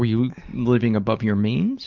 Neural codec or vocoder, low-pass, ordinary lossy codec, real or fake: none; 7.2 kHz; Opus, 32 kbps; real